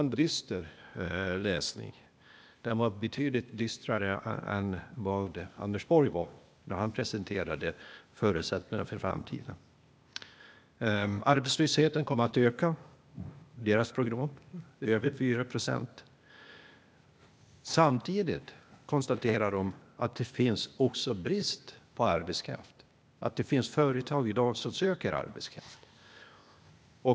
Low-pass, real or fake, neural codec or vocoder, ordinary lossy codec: none; fake; codec, 16 kHz, 0.8 kbps, ZipCodec; none